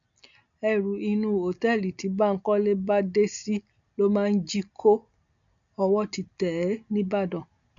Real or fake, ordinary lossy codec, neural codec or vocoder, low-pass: real; none; none; 7.2 kHz